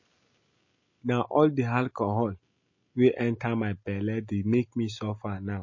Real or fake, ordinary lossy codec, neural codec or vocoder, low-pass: real; MP3, 32 kbps; none; 7.2 kHz